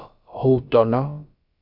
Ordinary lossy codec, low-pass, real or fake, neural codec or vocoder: Opus, 64 kbps; 5.4 kHz; fake; codec, 16 kHz, about 1 kbps, DyCAST, with the encoder's durations